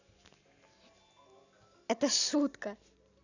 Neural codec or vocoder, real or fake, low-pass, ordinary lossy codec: none; real; 7.2 kHz; none